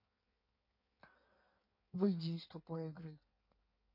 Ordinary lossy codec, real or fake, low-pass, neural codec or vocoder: MP3, 32 kbps; fake; 5.4 kHz; codec, 16 kHz in and 24 kHz out, 1.1 kbps, FireRedTTS-2 codec